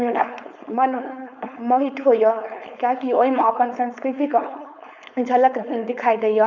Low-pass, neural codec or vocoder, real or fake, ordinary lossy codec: 7.2 kHz; codec, 16 kHz, 4.8 kbps, FACodec; fake; none